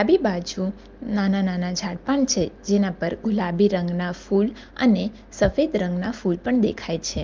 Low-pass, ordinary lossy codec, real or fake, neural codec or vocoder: 7.2 kHz; Opus, 32 kbps; real; none